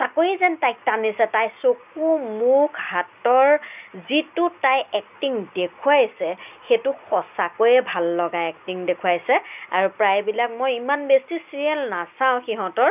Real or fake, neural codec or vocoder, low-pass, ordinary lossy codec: real; none; 3.6 kHz; none